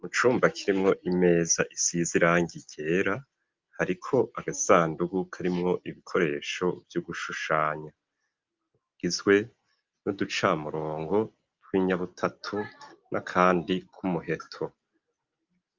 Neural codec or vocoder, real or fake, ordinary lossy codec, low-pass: none; real; Opus, 24 kbps; 7.2 kHz